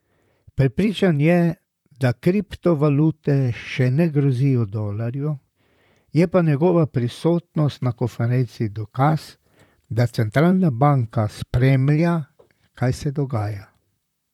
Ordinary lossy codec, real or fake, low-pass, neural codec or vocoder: none; fake; 19.8 kHz; vocoder, 44.1 kHz, 128 mel bands, Pupu-Vocoder